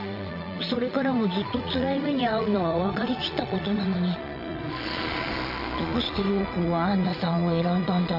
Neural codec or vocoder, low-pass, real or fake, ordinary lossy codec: vocoder, 22.05 kHz, 80 mel bands, Vocos; 5.4 kHz; fake; none